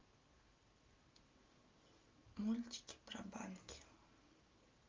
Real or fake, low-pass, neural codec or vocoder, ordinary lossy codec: real; 7.2 kHz; none; Opus, 32 kbps